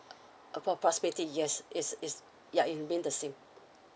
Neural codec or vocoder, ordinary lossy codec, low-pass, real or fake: none; none; none; real